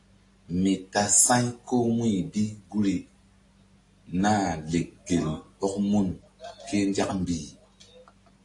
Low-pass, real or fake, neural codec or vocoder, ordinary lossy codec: 10.8 kHz; real; none; AAC, 48 kbps